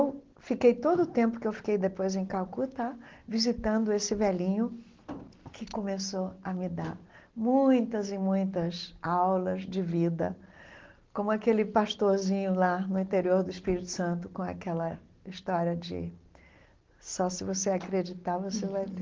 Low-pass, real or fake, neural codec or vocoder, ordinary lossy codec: 7.2 kHz; real; none; Opus, 16 kbps